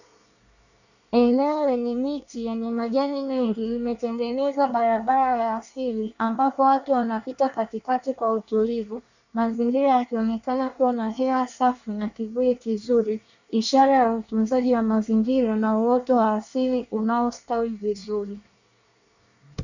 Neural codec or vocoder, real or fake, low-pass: codec, 24 kHz, 1 kbps, SNAC; fake; 7.2 kHz